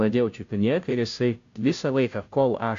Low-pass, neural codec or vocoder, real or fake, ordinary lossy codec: 7.2 kHz; codec, 16 kHz, 0.5 kbps, FunCodec, trained on Chinese and English, 25 frames a second; fake; AAC, 64 kbps